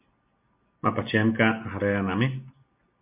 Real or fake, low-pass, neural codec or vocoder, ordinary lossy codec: real; 3.6 kHz; none; MP3, 32 kbps